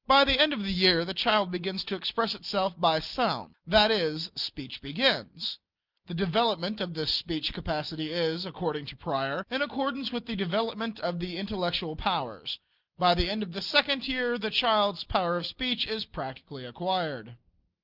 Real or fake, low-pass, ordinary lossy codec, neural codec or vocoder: real; 5.4 kHz; Opus, 32 kbps; none